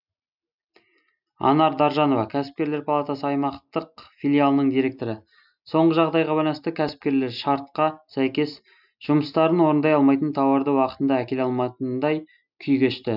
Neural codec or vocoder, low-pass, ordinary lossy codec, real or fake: none; 5.4 kHz; none; real